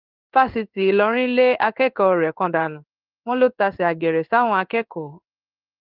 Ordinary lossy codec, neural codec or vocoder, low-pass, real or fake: Opus, 24 kbps; codec, 16 kHz in and 24 kHz out, 1 kbps, XY-Tokenizer; 5.4 kHz; fake